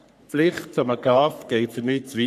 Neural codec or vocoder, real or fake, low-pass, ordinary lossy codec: codec, 44.1 kHz, 3.4 kbps, Pupu-Codec; fake; 14.4 kHz; none